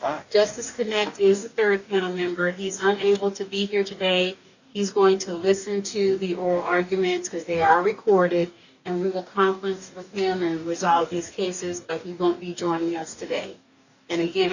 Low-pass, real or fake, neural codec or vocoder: 7.2 kHz; fake; codec, 44.1 kHz, 2.6 kbps, DAC